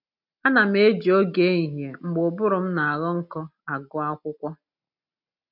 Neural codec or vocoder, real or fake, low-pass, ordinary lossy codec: none; real; 5.4 kHz; none